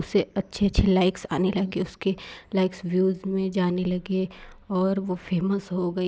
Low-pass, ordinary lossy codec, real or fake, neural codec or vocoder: none; none; real; none